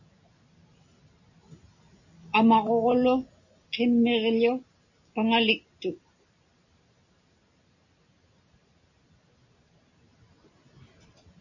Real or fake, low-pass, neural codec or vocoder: real; 7.2 kHz; none